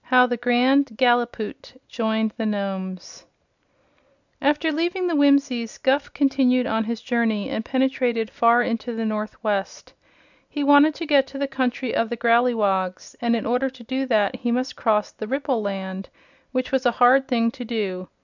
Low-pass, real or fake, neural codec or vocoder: 7.2 kHz; real; none